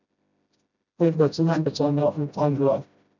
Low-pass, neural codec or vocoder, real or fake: 7.2 kHz; codec, 16 kHz, 0.5 kbps, FreqCodec, smaller model; fake